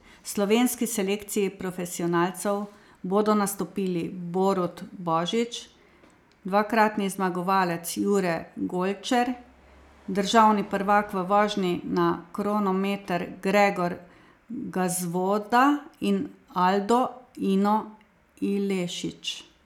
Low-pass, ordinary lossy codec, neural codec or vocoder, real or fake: 19.8 kHz; none; none; real